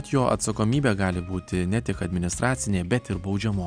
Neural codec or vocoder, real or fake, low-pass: none; real; 9.9 kHz